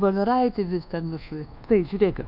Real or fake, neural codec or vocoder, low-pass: fake; codec, 24 kHz, 1.2 kbps, DualCodec; 5.4 kHz